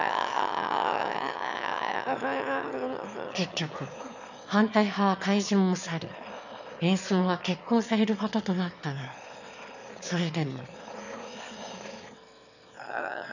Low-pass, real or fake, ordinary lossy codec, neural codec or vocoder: 7.2 kHz; fake; none; autoencoder, 22.05 kHz, a latent of 192 numbers a frame, VITS, trained on one speaker